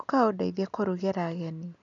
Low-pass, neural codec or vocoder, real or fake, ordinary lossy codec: 7.2 kHz; none; real; none